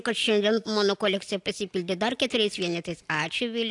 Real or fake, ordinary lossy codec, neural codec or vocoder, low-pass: real; AAC, 64 kbps; none; 10.8 kHz